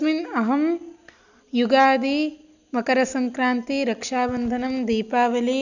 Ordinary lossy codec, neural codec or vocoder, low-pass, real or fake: none; none; 7.2 kHz; real